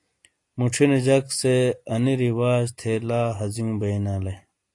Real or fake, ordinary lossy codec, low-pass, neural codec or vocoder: real; AAC, 48 kbps; 10.8 kHz; none